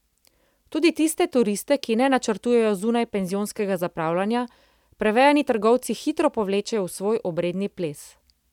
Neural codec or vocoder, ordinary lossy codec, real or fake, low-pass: none; none; real; 19.8 kHz